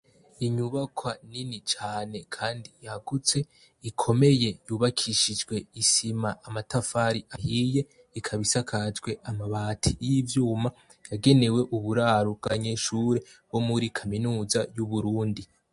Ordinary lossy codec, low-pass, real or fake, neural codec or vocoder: MP3, 48 kbps; 14.4 kHz; real; none